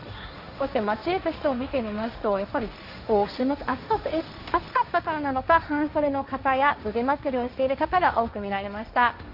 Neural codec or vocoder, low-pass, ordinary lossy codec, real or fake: codec, 16 kHz, 1.1 kbps, Voila-Tokenizer; 5.4 kHz; Opus, 64 kbps; fake